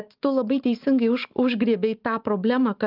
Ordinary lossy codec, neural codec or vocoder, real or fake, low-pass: Opus, 24 kbps; none; real; 5.4 kHz